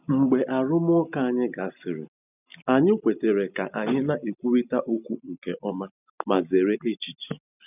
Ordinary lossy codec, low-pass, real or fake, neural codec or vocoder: none; 3.6 kHz; real; none